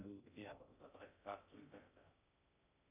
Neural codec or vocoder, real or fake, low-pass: codec, 16 kHz in and 24 kHz out, 0.6 kbps, FocalCodec, streaming, 2048 codes; fake; 3.6 kHz